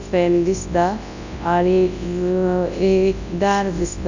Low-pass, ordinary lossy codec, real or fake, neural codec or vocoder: 7.2 kHz; none; fake; codec, 24 kHz, 0.9 kbps, WavTokenizer, large speech release